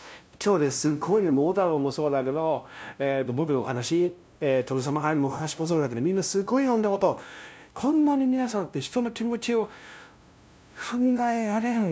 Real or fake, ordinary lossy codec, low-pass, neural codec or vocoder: fake; none; none; codec, 16 kHz, 0.5 kbps, FunCodec, trained on LibriTTS, 25 frames a second